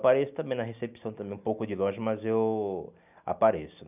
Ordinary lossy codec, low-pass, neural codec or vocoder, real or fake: none; 3.6 kHz; none; real